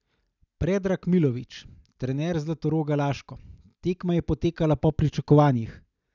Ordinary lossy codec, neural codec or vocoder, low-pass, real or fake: none; none; 7.2 kHz; real